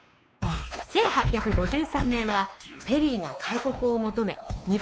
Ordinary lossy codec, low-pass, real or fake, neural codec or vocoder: none; none; fake; codec, 16 kHz, 2 kbps, X-Codec, WavLM features, trained on Multilingual LibriSpeech